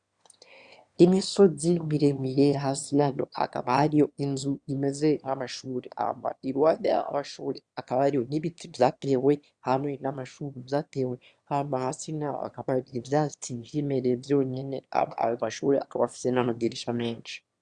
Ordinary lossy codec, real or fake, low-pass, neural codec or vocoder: Opus, 64 kbps; fake; 9.9 kHz; autoencoder, 22.05 kHz, a latent of 192 numbers a frame, VITS, trained on one speaker